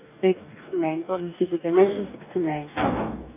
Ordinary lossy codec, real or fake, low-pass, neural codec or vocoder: none; fake; 3.6 kHz; codec, 44.1 kHz, 2.6 kbps, DAC